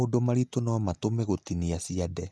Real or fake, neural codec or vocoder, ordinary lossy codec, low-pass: real; none; none; none